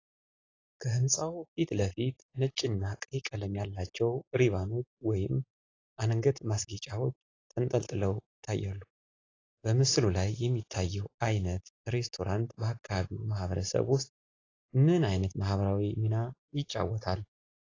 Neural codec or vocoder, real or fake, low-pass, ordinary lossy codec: none; real; 7.2 kHz; AAC, 32 kbps